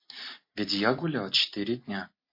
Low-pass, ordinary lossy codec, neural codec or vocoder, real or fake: 5.4 kHz; MP3, 32 kbps; none; real